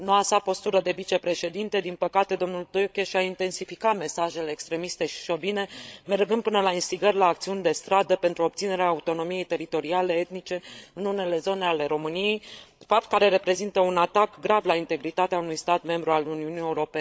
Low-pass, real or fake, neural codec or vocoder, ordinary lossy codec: none; fake; codec, 16 kHz, 16 kbps, FreqCodec, larger model; none